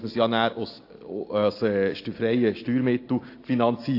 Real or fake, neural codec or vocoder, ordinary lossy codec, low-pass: real; none; AAC, 32 kbps; 5.4 kHz